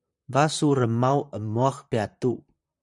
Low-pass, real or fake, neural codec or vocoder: 10.8 kHz; fake; vocoder, 44.1 kHz, 128 mel bands, Pupu-Vocoder